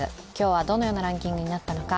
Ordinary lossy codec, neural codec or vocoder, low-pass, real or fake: none; none; none; real